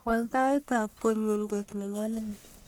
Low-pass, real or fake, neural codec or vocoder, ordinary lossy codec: none; fake; codec, 44.1 kHz, 1.7 kbps, Pupu-Codec; none